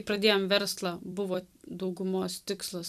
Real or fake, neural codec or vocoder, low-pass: fake; vocoder, 44.1 kHz, 128 mel bands every 256 samples, BigVGAN v2; 14.4 kHz